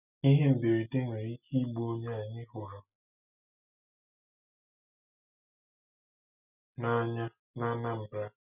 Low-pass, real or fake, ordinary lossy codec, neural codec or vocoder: 3.6 kHz; real; none; none